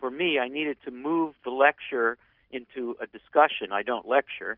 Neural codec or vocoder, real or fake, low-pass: none; real; 5.4 kHz